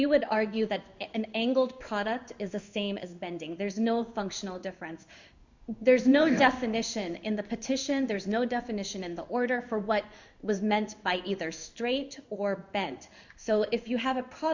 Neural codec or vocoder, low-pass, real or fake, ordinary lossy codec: codec, 16 kHz in and 24 kHz out, 1 kbps, XY-Tokenizer; 7.2 kHz; fake; Opus, 64 kbps